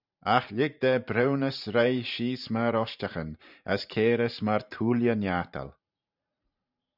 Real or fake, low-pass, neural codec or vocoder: fake; 5.4 kHz; vocoder, 44.1 kHz, 128 mel bands every 512 samples, BigVGAN v2